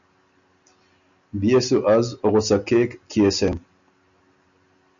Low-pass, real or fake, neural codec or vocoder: 7.2 kHz; real; none